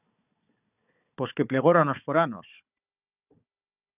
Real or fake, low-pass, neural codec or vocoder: fake; 3.6 kHz; codec, 16 kHz, 4 kbps, FunCodec, trained on Chinese and English, 50 frames a second